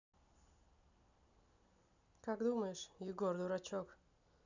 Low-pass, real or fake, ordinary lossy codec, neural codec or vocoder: 7.2 kHz; real; none; none